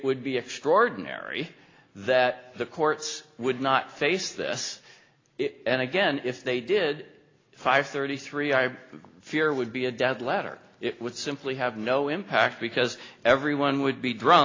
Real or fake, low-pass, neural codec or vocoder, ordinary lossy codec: real; 7.2 kHz; none; AAC, 32 kbps